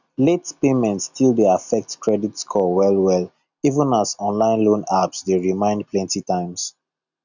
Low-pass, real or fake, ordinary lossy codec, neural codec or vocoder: 7.2 kHz; real; none; none